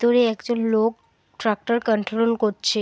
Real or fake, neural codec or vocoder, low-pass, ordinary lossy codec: real; none; none; none